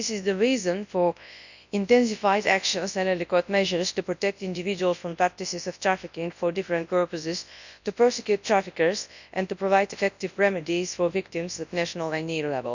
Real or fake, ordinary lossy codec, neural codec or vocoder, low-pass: fake; none; codec, 24 kHz, 0.9 kbps, WavTokenizer, large speech release; 7.2 kHz